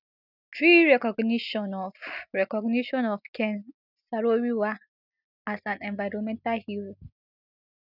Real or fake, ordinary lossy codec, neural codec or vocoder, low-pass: real; none; none; 5.4 kHz